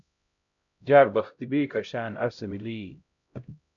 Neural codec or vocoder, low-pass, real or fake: codec, 16 kHz, 0.5 kbps, X-Codec, HuBERT features, trained on LibriSpeech; 7.2 kHz; fake